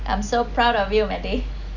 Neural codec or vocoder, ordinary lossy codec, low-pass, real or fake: none; none; 7.2 kHz; real